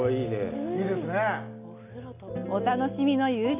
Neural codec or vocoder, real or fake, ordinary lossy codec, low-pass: none; real; none; 3.6 kHz